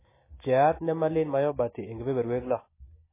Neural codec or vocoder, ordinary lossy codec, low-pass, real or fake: none; MP3, 16 kbps; 3.6 kHz; real